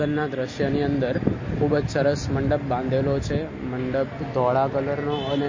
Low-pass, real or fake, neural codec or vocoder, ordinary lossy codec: 7.2 kHz; real; none; MP3, 32 kbps